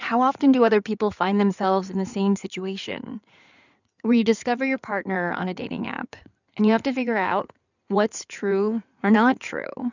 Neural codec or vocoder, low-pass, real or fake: codec, 16 kHz in and 24 kHz out, 2.2 kbps, FireRedTTS-2 codec; 7.2 kHz; fake